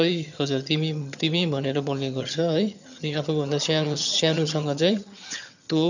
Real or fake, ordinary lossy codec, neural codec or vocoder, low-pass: fake; none; vocoder, 22.05 kHz, 80 mel bands, HiFi-GAN; 7.2 kHz